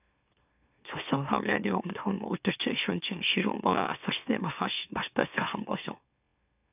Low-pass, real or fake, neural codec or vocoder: 3.6 kHz; fake; autoencoder, 44.1 kHz, a latent of 192 numbers a frame, MeloTTS